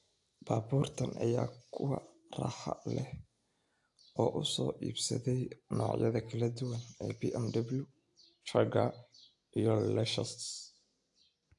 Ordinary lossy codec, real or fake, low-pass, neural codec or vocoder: AAC, 64 kbps; real; 10.8 kHz; none